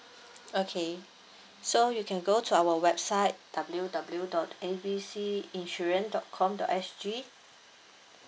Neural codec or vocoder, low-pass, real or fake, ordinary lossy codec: none; none; real; none